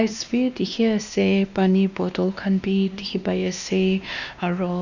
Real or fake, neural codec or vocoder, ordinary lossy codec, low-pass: fake; codec, 16 kHz, 2 kbps, X-Codec, WavLM features, trained on Multilingual LibriSpeech; none; 7.2 kHz